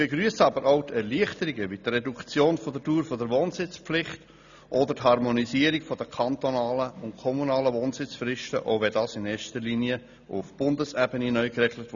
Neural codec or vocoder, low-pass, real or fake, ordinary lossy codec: none; 7.2 kHz; real; none